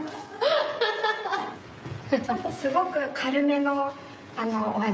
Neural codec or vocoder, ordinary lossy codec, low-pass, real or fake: codec, 16 kHz, 16 kbps, FreqCodec, smaller model; none; none; fake